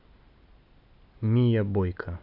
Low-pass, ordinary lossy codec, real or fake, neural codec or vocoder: 5.4 kHz; none; real; none